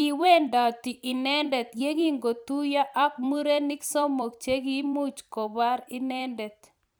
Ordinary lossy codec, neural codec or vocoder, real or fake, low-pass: none; vocoder, 44.1 kHz, 128 mel bands every 256 samples, BigVGAN v2; fake; none